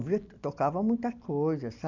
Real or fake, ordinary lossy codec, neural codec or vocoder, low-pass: real; none; none; 7.2 kHz